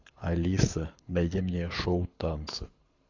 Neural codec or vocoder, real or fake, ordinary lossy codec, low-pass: codec, 24 kHz, 6 kbps, HILCodec; fake; AAC, 48 kbps; 7.2 kHz